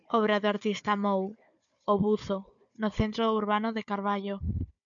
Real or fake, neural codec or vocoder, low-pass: fake; codec, 16 kHz, 4 kbps, FunCodec, trained on Chinese and English, 50 frames a second; 7.2 kHz